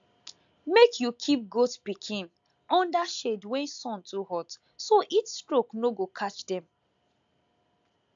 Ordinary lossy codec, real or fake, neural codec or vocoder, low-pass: AAC, 64 kbps; real; none; 7.2 kHz